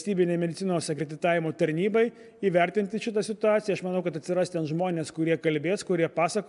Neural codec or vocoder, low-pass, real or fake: none; 10.8 kHz; real